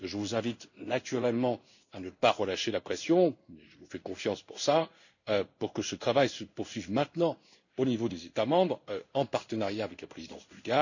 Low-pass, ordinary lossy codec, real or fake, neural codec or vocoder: 7.2 kHz; none; fake; codec, 16 kHz in and 24 kHz out, 1 kbps, XY-Tokenizer